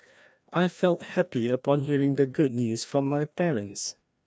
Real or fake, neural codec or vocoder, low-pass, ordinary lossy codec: fake; codec, 16 kHz, 1 kbps, FreqCodec, larger model; none; none